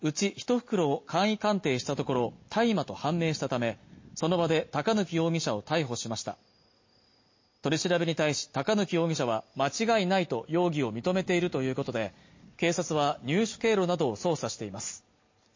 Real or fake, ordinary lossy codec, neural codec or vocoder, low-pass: real; MP3, 32 kbps; none; 7.2 kHz